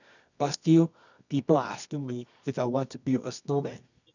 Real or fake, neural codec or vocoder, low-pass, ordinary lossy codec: fake; codec, 24 kHz, 0.9 kbps, WavTokenizer, medium music audio release; 7.2 kHz; none